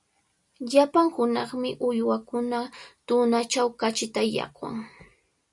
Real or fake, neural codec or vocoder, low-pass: real; none; 10.8 kHz